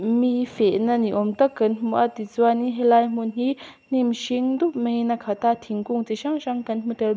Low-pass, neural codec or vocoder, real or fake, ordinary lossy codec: none; none; real; none